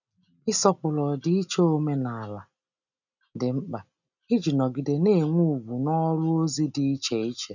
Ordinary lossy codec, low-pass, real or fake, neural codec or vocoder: none; 7.2 kHz; real; none